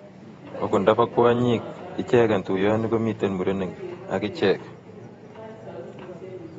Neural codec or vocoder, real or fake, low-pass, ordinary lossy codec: vocoder, 48 kHz, 128 mel bands, Vocos; fake; 19.8 kHz; AAC, 24 kbps